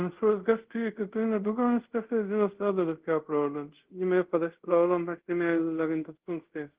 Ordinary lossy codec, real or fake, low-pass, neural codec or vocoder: Opus, 16 kbps; fake; 3.6 kHz; codec, 24 kHz, 0.5 kbps, DualCodec